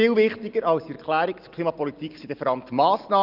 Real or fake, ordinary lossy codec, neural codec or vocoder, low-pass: real; Opus, 32 kbps; none; 5.4 kHz